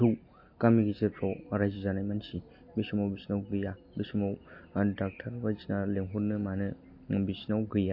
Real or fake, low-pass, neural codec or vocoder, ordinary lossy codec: real; 5.4 kHz; none; MP3, 32 kbps